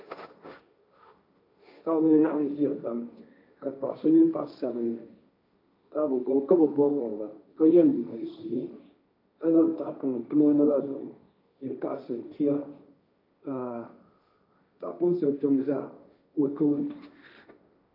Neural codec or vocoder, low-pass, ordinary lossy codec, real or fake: codec, 16 kHz, 1.1 kbps, Voila-Tokenizer; 5.4 kHz; AAC, 48 kbps; fake